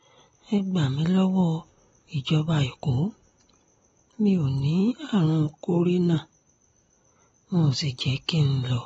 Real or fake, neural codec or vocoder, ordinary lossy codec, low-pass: real; none; AAC, 24 kbps; 19.8 kHz